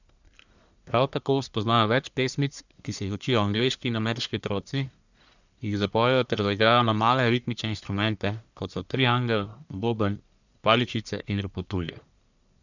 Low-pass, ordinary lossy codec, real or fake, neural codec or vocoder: 7.2 kHz; none; fake; codec, 44.1 kHz, 1.7 kbps, Pupu-Codec